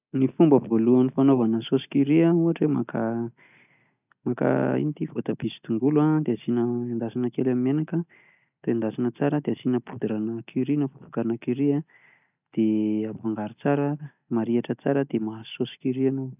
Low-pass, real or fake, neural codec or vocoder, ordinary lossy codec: 3.6 kHz; real; none; none